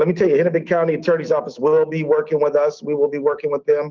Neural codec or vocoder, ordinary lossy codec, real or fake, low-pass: autoencoder, 48 kHz, 128 numbers a frame, DAC-VAE, trained on Japanese speech; Opus, 32 kbps; fake; 7.2 kHz